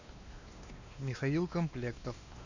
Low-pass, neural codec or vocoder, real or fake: 7.2 kHz; codec, 16 kHz, 2 kbps, X-Codec, WavLM features, trained on Multilingual LibriSpeech; fake